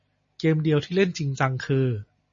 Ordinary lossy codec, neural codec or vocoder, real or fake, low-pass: MP3, 32 kbps; none; real; 7.2 kHz